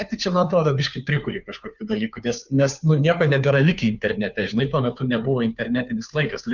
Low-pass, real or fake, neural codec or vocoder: 7.2 kHz; fake; codec, 16 kHz, 2 kbps, FunCodec, trained on Chinese and English, 25 frames a second